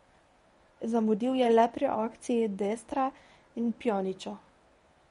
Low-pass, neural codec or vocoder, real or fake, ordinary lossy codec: 10.8 kHz; codec, 24 kHz, 0.9 kbps, WavTokenizer, medium speech release version 1; fake; MP3, 48 kbps